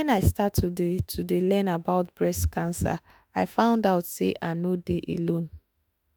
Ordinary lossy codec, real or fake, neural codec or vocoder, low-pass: none; fake; autoencoder, 48 kHz, 32 numbers a frame, DAC-VAE, trained on Japanese speech; none